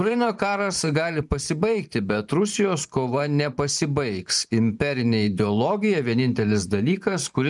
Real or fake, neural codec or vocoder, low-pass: fake; vocoder, 44.1 kHz, 128 mel bands every 512 samples, BigVGAN v2; 10.8 kHz